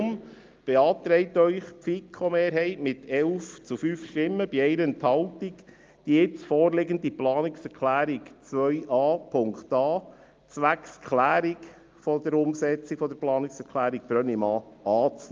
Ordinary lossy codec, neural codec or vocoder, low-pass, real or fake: Opus, 32 kbps; none; 7.2 kHz; real